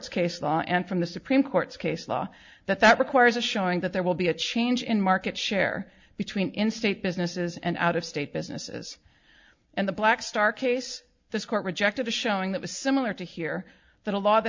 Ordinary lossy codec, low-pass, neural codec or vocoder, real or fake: MP3, 64 kbps; 7.2 kHz; none; real